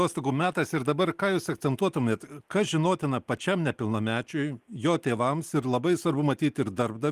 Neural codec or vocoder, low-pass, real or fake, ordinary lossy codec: none; 14.4 kHz; real; Opus, 24 kbps